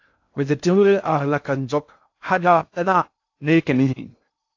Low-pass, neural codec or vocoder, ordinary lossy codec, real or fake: 7.2 kHz; codec, 16 kHz in and 24 kHz out, 0.6 kbps, FocalCodec, streaming, 2048 codes; AAC, 48 kbps; fake